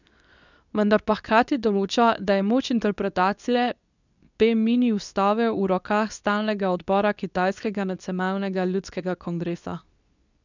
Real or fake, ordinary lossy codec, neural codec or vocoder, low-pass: fake; none; codec, 24 kHz, 0.9 kbps, WavTokenizer, medium speech release version 2; 7.2 kHz